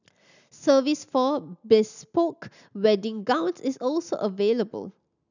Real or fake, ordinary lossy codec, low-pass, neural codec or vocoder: real; none; 7.2 kHz; none